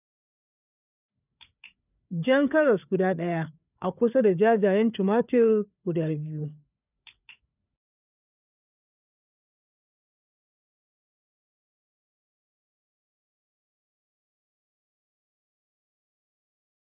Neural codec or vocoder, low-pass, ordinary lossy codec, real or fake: codec, 16 kHz, 4 kbps, FreqCodec, larger model; 3.6 kHz; none; fake